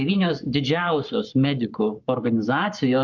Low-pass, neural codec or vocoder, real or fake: 7.2 kHz; codec, 44.1 kHz, 7.8 kbps, Pupu-Codec; fake